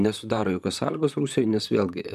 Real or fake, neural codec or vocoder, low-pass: fake; vocoder, 44.1 kHz, 128 mel bands, Pupu-Vocoder; 14.4 kHz